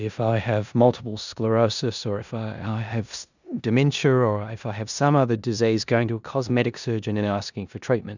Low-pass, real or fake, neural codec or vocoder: 7.2 kHz; fake; codec, 16 kHz in and 24 kHz out, 0.9 kbps, LongCat-Audio-Codec, four codebook decoder